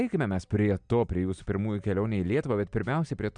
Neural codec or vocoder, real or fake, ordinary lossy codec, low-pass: none; real; MP3, 96 kbps; 9.9 kHz